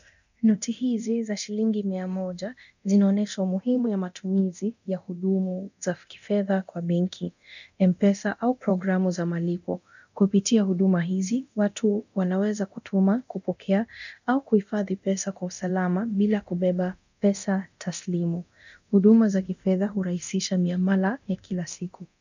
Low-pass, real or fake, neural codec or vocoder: 7.2 kHz; fake; codec, 24 kHz, 0.9 kbps, DualCodec